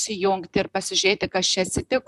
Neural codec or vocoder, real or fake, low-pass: vocoder, 44.1 kHz, 128 mel bands, Pupu-Vocoder; fake; 14.4 kHz